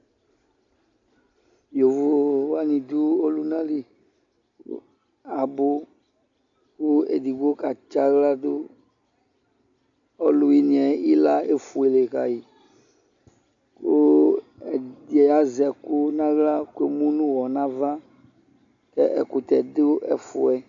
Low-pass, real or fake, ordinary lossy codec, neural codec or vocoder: 7.2 kHz; real; AAC, 64 kbps; none